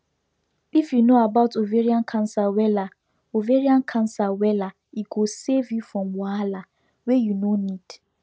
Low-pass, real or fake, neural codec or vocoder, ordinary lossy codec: none; real; none; none